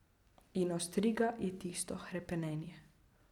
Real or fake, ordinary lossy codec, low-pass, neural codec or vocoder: real; none; 19.8 kHz; none